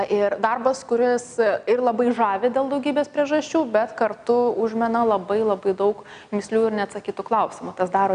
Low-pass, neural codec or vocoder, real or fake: 9.9 kHz; none; real